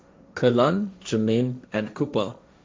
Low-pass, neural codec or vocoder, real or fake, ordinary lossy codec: none; codec, 16 kHz, 1.1 kbps, Voila-Tokenizer; fake; none